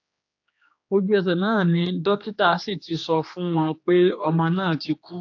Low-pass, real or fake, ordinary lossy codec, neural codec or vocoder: 7.2 kHz; fake; AAC, 48 kbps; codec, 16 kHz, 2 kbps, X-Codec, HuBERT features, trained on general audio